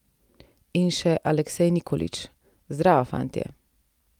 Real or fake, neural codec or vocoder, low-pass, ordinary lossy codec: real; none; 19.8 kHz; Opus, 32 kbps